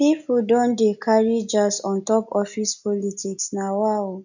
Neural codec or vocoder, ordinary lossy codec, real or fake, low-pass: none; none; real; 7.2 kHz